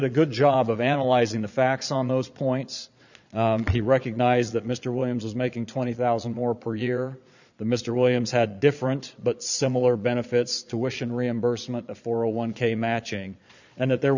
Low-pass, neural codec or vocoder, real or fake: 7.2 kHz; vocoder, 22.05 kHz, 80 mel bands, Vocos; fake